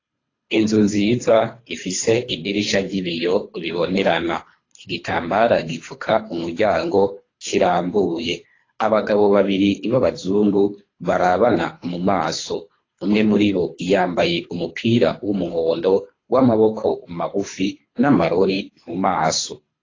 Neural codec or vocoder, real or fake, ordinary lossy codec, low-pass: codec, 24 kHz, 3 kbps, HILCodec; fake; AAC, 32 kbps; 7.2 kHz